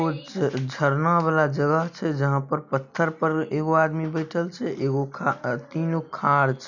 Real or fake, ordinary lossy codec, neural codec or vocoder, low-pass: real; none; none; 7.2 kHz